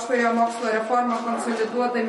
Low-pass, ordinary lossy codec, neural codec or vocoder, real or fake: 19.8 kHz; MP3, 48 kbps; autoencoder, 48 kHz, 128 numbers a frame, DAC-VAE, trained on Japanese speech; fake